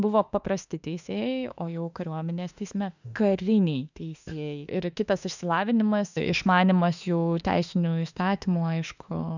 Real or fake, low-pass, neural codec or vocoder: fake; 7.2 kHz; autoencoder, 48 kHz, 32 numbers a frame, DAC-VAE, trained on Japanese speech